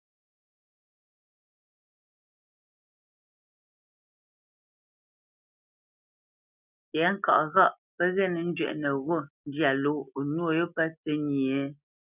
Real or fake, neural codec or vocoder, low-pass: real; none; 3.6 kHz